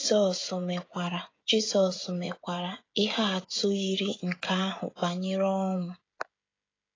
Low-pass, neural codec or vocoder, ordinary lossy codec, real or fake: 7.2 kHz; codec, 16 kHz, 16 kbps, FreqCodec, smaller model; AAC, 32 kbps; fake